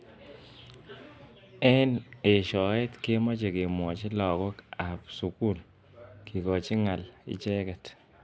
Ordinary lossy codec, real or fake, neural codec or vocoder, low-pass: none; real; none; none